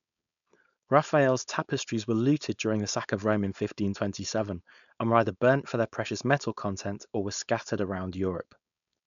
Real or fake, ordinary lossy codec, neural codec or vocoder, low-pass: fake; none; codec, 16 kHz, 4.8 kbps, FACodec; 7.2 kHz